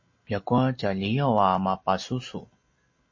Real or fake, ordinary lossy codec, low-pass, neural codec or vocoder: real; MP3, 32 kbps; 7.2 kHz; none